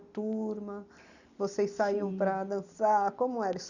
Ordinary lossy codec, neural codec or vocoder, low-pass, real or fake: none; none; 7.2 kHz; real